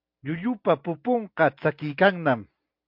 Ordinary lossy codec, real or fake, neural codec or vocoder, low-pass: MP3, 48 kbps; real; none; 5.4 kHz